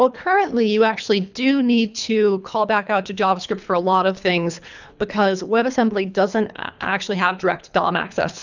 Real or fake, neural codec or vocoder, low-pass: fake; codec, 24 kHz, 3 kbps, HILCodec; 7.2 kHz